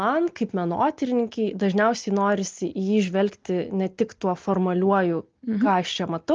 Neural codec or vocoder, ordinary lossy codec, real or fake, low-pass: none; Opus, 32 kbps; real; 7.2 kHz